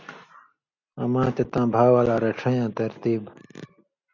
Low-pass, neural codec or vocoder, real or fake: 7.2 kHz; none; real